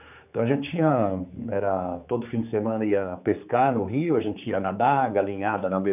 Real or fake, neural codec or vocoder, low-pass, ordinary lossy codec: fake; codec, 16 kHz, 4 kbps, X-Codec, HuBERT features, trained on general audio; 3.6 kHz; none